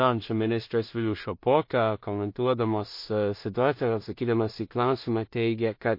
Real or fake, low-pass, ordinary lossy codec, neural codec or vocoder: fake; 5.4 kHz; MP3, 32 kbps; codec, 16 kHz in and 24 kHz out, 0.4 kbps, LongCat-Audio-Codec, two codebook decoder